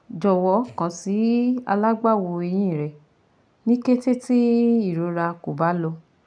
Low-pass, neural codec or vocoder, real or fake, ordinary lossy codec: 9.9 kHz; none; real; none